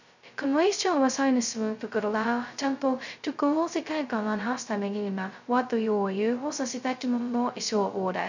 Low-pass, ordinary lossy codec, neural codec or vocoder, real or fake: 7.2 kHz; none; codec, 16 kHz, 0.2 kbps, FocalCodec; fake